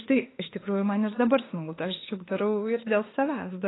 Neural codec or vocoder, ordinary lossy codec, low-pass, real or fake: autoencoder, 48 kHz, 32 numbers a frame, DAC-VAE, trained on Japanese speech; AAC, 16 kbps; 7.2 kHz; fake